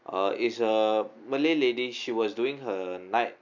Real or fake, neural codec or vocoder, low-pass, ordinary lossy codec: real; none; 7.2 kHz; none